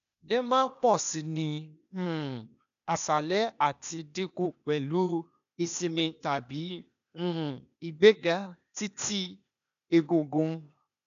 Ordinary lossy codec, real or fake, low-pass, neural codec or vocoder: none; fake; 7.2 kHz; codec, 16 kHz, 0.8 kbps, ZipCodec